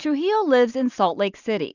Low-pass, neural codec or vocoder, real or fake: 7.2 kHz; none; real